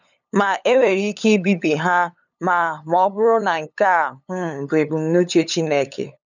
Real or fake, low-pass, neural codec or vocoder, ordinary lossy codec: fake; 7.2 kHz; codec, 16 kHz, 8 kbps, FunCodec, trained on LibriTTS, 25 frames a second; none